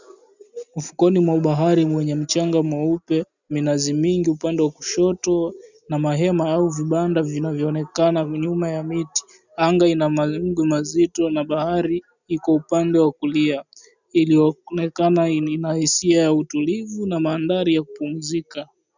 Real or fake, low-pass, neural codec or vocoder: real; 7.2 kHz; none